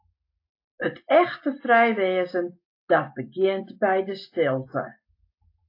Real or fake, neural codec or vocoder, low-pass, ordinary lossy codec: real; none; 5.4 kHz; AAC, 32 kbps